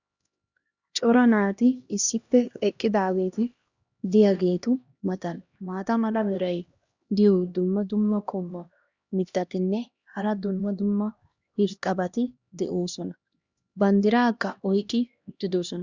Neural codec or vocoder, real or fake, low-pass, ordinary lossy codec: codec, 16 kHz, 1 kbps, X-Codec, HuBERT features, trained on LibriSpeech; fake; 7.2 kHz; Opus, 64 kbps